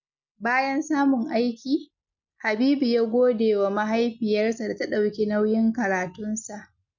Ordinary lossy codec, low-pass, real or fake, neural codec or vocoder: none; 7.2 kHz; real; none